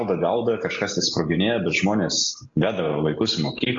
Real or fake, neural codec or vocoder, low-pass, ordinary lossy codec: real; none; 7.2 kHz; AAC, 48 kbps